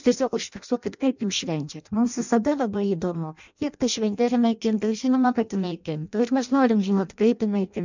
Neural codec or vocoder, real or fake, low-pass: codec, 16 kHz in and 24 kHz out, 0.6 kbps, FireRedTTS-2 codec; fake; 7.2 kHz